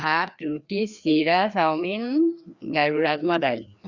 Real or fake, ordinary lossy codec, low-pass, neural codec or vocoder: fake; Opus, 64 kbps; 7.2 kHz; codec, 16 kHz, 2 kbps, FreqCodec, larger model